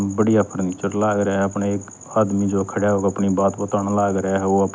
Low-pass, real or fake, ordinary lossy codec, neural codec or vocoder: none; real; none; none